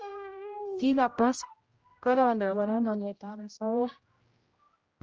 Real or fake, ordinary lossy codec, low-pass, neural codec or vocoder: fake; Opus, 24 kbps; 7.2 kHz; codec, 16 kHz, 0.5 kbps, X-Codec, HuBERT features, trained on general audio